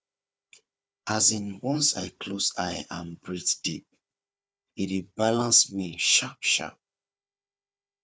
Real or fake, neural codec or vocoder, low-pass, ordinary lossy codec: fake; codec, 16 kHz, 4 kbps, FunCodec, trained on Chinese and English, 50 frames a second; none; none